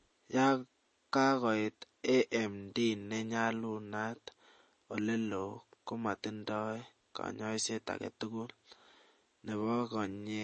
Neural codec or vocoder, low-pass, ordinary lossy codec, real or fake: none; 10.8 kHz; MP3, 32 kbps; real